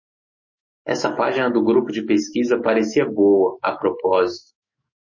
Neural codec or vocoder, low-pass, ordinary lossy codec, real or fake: autoencoder, 48 kHz, 128 numbers a frame, DAC-VAE, trained on Japanese speech; 7.2 kHz; MP3, 32 kbps; fake